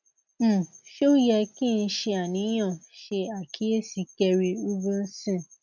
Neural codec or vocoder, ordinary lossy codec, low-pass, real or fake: none; none; 7.2 kHz; real